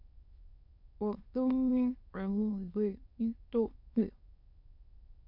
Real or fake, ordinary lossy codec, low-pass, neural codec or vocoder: fake; MP3, 48 kbps; 5.4 kHz; autoencoder, 22.05 kHz, a latent of 192 numbers a frame, VITS, trained on many speakers